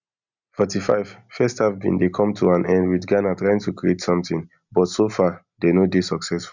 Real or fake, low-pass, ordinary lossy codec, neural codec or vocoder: real; 7.2 kHz; none; none